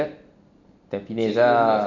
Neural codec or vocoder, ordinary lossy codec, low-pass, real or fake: none; none; 7.2 kHz; real